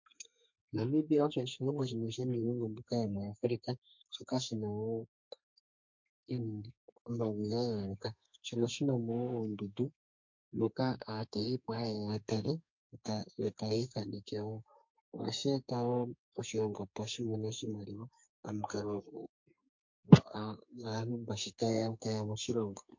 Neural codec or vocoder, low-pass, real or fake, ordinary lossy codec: codec, 32 kHz, 1.9 kbps, SNAC; 7.2 kHz; fake; MP3, 48 kbps